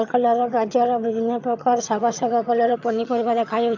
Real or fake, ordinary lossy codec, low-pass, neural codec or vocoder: fake; MP3, 64 kbps; 7.2 kHz; vocoder, 22.05 kHz, 80 mel bands, HiFi-GAN